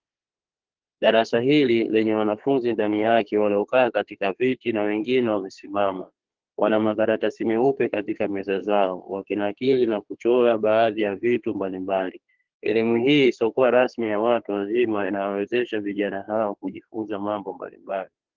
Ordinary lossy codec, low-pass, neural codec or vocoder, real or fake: Opus, 16 kbps; 7.2 kHz; codec, 32 kHz, 1.9 kbps, SNAC; fake